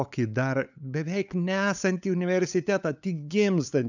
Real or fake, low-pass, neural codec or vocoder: fake; 7.2 kHz; codec, 16 kHz, 8 kbps, FunCodec, trained on LibriTTS, 25 frames a second